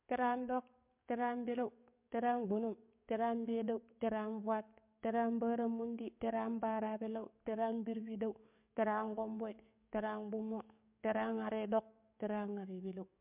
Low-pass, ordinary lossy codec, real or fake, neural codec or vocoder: 3.6 kHz; MP3, 32 kbps; fake; codec, 44.1 kHz, 7.8 kbps, DAC